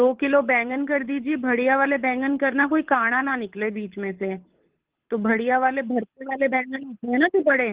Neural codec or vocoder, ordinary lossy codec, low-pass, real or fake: codec, 24 kHz, 6 kbps, HILCodec; Opus, 16 kbps; 3.6 kHz; fake